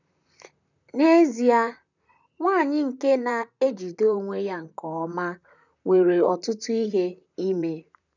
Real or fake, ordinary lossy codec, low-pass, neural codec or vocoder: fake; none; 7.2 kHz; vocoder, 44.1 kHz, 128 mel bands, Pupu-Vocoder